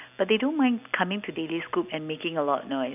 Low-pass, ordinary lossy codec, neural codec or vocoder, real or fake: 3.6 kHz; none; none; real